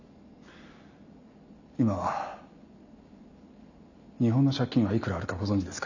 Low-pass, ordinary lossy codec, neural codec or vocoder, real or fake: 7.2 kHz; none; none; real